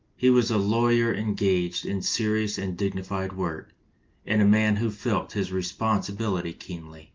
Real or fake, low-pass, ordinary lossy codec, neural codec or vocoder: real; 7.2 kHz; Opus, 32 kbps; none